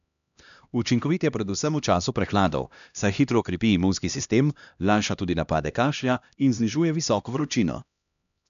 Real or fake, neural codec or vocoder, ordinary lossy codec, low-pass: fake; codec, 16 kHz, 1 kbps, X-Codec, HuBERT features, trained on LibriSpeech; none; 7.2 kHz